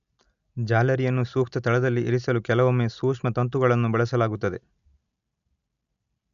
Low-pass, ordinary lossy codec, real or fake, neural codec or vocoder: 7.2 kHz; none; real; none